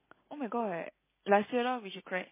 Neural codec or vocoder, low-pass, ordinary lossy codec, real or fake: none; 3.6 kHz; MP3, 16 kbps; real